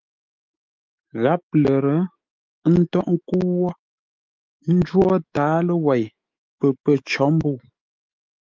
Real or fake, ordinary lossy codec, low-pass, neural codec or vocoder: fake; Opus, 24 kbps; 7.2 kHz; autoencoder, 48 kHz, 128 numbers a frame, DAC-VAE, trained on Japanese speech